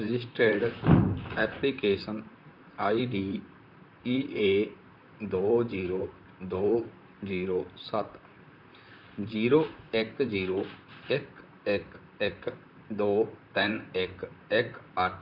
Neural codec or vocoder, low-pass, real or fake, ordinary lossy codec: vocoder, 44.1 kHz, 128 mel bands, Pupu-Vocoder; 5.4 kHz; fake; MP3, 48 kbps